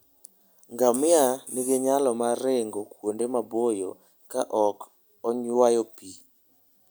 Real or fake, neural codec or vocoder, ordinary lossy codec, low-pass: real; none; none; none